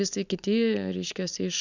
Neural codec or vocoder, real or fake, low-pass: none; real; 7.2 kHz